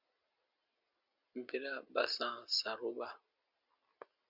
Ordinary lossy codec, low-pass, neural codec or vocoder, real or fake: Opus, 64 kbps; 5.4 kHz; none; real